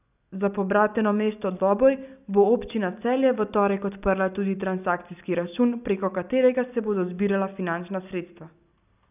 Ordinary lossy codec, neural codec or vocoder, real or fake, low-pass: none; none; real; 3.6 kHz